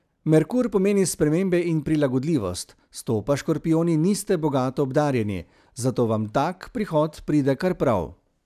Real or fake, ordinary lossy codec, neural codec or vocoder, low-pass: real; none; none; 14.4 kHz